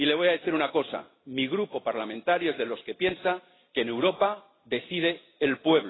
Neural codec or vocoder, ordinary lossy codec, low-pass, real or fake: none; AAC, 16 kbps; 7.2 kHz; real